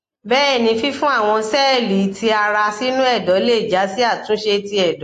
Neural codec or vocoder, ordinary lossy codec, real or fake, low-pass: none; AAC, 48 kbps; real; 14.4 kHz